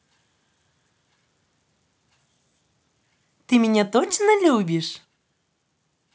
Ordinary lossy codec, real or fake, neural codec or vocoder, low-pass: none; real; none; none